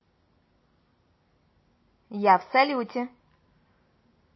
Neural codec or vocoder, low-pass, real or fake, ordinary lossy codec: none; 7.2 kHz; real; MP3, 24 kbps